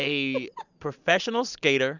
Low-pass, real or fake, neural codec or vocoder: 7.2 kHz; real; none